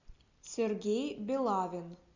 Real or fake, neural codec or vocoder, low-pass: real; none; 7.2 kHz